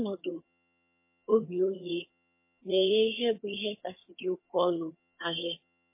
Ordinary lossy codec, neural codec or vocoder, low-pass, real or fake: MP3, 24 kbps; vocoder, 22.05 kHz, 80 mel bands, HiFi-GAN; 3.6 kHz; fake